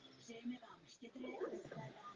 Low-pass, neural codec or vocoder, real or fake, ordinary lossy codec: 7.2 kHz; none; real; Opus, 16 kbps